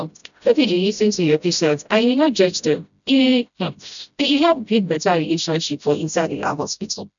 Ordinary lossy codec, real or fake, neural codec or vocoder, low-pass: none; fake; codec, 16 kHz, 0.5 kbps, FreqCodec, smaller model; 7.2 kHz